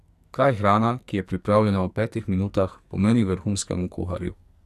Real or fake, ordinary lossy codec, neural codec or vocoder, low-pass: fake; none; codec, 44.1 kHz, 2.6 kbps, SNAC; 14.4 kHz